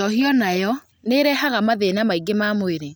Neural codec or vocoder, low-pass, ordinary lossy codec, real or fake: none; none; none; real